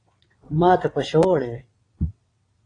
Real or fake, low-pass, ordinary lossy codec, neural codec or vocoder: fake; 9.9 kHz; AAC, 32 kbps; vocoder, 22.05 kHz, 80 mel bands, WaveNeXt